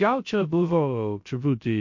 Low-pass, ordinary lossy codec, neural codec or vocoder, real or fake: 7.2 kHz; MP3, 48 kbps; codec, 16 kHz, 0.3 kbps, FocalCodec; fake